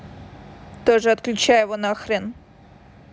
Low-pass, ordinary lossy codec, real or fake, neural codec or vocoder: none; none; real; none